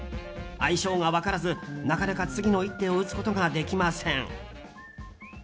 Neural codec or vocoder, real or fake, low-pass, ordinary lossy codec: none; real; none; none